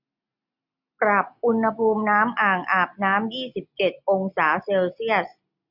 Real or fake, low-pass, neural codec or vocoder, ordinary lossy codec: real; 5.4 kHz; none; none